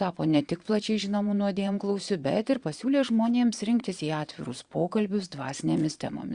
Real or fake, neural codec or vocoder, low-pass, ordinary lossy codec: fake; vocoder, 22.05 kHz, 80 mel bands, Vocos; 9.9 kHz; Opus, 64 kbps